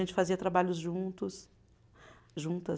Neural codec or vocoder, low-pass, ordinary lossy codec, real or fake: none; none; none; real